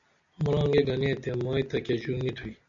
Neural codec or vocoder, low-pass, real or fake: none; 7.2 kHz; real